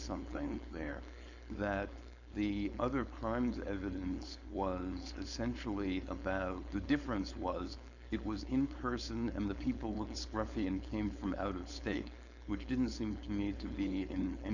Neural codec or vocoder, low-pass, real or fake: codec, 16 kHz, 4.8 kbps, FACodec; 7.2 kHz; fake